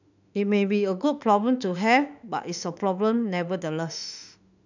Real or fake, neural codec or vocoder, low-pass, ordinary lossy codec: fake; autoencoder, 48 kHz, 32 numbers a frame, DAC-VAE, trained on Japanese speech; 7.2 kHz; none